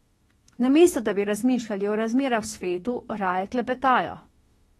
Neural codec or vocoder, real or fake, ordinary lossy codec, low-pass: autoencoder, 48 kHz, 32 numbers a frame, DAC-VAE, trained on Japanese speech; fake; AAC, 32 kbps; 19.8 kHz